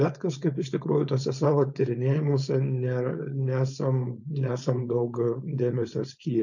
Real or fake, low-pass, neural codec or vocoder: fake; 7.2 kHz; codec, 16 kHz, 4.8 kbps, FACodec